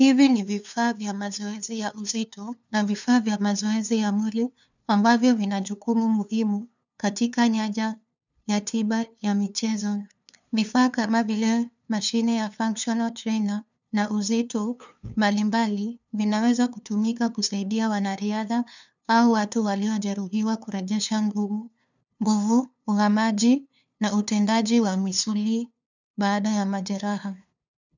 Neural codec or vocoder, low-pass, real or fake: codec, 16 kHz, 2 kbps, FunCodec, trained on LibriTTS, 25 frames a second; 7.2 kHz; fake